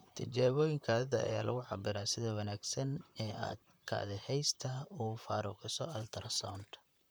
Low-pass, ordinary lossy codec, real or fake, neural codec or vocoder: none; none; fake; vocoder, 44.1 kHz, 128 mel bands, Pupu-Vocoder